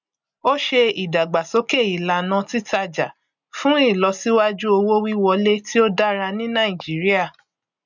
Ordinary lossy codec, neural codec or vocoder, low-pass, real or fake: none; none; 7.2 kHz; real